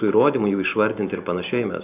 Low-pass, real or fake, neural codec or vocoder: 3.6 kHz; real; none